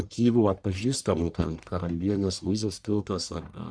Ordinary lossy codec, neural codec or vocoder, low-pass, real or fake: Opus, 64 kbps; codec, 44.1 kHz, 1.7 kbps, Pupu-Codec; 9.9 kHz; fake